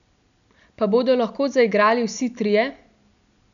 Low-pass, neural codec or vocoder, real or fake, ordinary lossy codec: 7.2 kHz; none; real; none